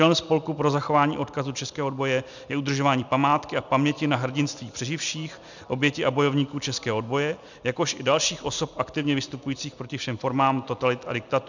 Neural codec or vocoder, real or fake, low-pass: none; real; 7.2 kHz